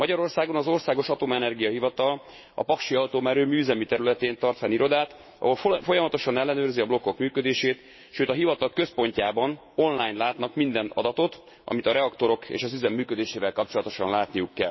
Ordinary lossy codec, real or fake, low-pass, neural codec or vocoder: MP3, 24 kbps; fake; 7.2 kHz; autoencoder, 48 kHz, 128 numbers a frame, DAC-VAE, trained on Japanese speech